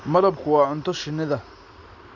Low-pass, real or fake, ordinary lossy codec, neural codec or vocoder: 7.2 kHz; real; none; none